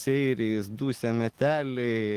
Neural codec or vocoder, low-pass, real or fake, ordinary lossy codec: codec, 44.1 kHz, 7.8 kbps, DAC; 14.4 kHz; fake; Opus, 24 kbps